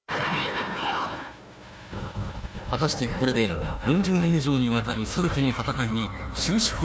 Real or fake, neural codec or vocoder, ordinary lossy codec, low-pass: fake; codec, 16 kHz, 1 kbps, FunCodec, trained on Chinese and English, 50 frames a second; none; none